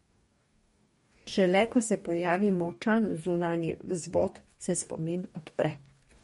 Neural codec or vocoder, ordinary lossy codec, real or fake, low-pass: codec, 44.1 kHz, 2.6 kbps, DAC; MP3, 48 kbps; fake; 19.8 kHz